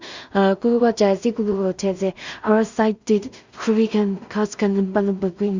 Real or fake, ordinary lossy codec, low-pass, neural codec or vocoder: fake; Opus, 64 kbps; 7.2 kHz; codec, 16 kHz in and 24 kHz out, 0.4 kbps, LongCat-Audio-Codec, two codebook decoder